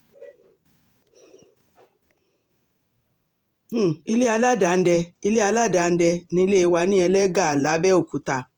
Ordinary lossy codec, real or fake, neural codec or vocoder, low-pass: Opus, 32 kbps; fake; vocoder, 48 kHz, 128 mel bands, Vocos; 19.8 kHz